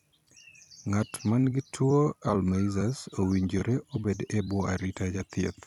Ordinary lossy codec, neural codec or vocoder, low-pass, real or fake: none; vocoder, 44.1 kHz, 128 mel bands every 256 samples, BigVGAN v2; 19.8 kHz; fake